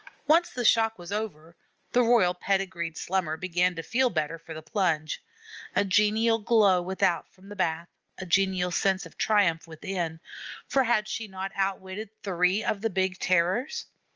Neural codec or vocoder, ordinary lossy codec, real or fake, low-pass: none; Opus, 24 kbps; real; 7.2 kHz